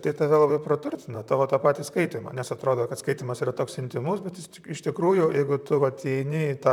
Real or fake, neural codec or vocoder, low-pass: fake; vocoder, 44.1 kHz, 128 mel bands, Pupu-Vocoder; 19.8 kHz